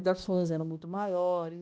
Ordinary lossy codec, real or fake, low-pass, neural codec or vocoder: none; fake; none; codec, 16 kHz, 1 kbps, X-Codec, HuBERT features, trained on balanced general audio